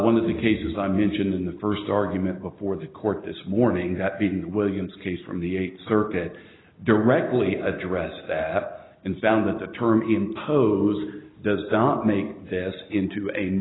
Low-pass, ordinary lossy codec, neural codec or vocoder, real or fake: 7.2 kHz; AAC, 16 kbps; none; real